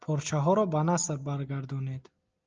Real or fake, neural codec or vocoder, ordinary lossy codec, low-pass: real; none; Opus, 24 kbps; 7.2 kHz